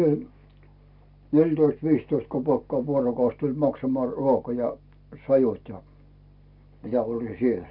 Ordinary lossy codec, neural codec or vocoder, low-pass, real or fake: none; none; 5.4 kHz; real